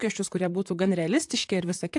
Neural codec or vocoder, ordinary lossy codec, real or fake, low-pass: vocoder, 44.1 kHz, 128 mel bands, Pupu-Vocoder; AAC, 64 kbps; fake; 10.8 kHz